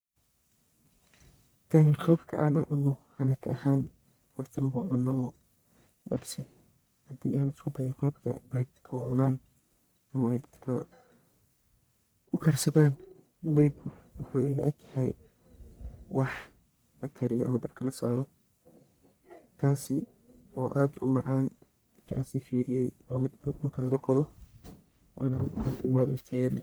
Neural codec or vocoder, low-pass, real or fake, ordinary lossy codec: codec, 44.1 kHz, 1.7 kbps, Pupu-Codec; none; fake; none